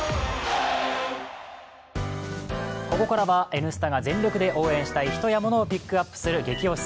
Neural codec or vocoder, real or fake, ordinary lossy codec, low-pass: none; real; none; none